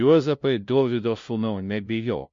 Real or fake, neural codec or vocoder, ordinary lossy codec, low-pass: fake; codec, 16 kHz, 0.5 kbps, FunCodec, trained on LibriTTS, 25 frames a second; MP3, 48 kbps; 7.2 kHz